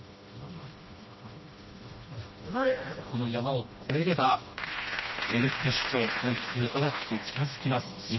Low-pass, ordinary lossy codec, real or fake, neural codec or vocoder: 7.2 kHz; MP3, 24 kbps; fake; codec, 16 kHz, 1 kbps, FreqCodec, smaller model